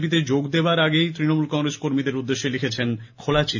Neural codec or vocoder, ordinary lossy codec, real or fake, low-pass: none; none; real; 7.2 kHz